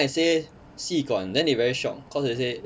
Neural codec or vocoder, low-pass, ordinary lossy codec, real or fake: none; none; none; real